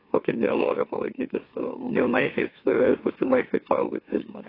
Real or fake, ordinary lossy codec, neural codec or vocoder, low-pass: fake; AAC, 24 kbps; autoencoder, 44.1 kHz, a latent of 192 numbers a frame, MeloTTS; 5.4 kHz